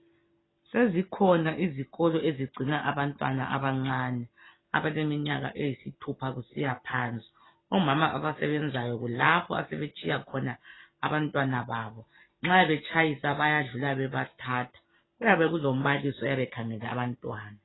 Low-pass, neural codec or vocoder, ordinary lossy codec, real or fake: 7.2 kHz; none; AAC, 16 kbps; real